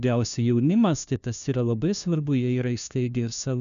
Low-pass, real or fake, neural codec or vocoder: 7.2 kHz; fake; codec, 16 kHz, 1 kbps, FunCodec, trained on LibriTTS, 50 frames a second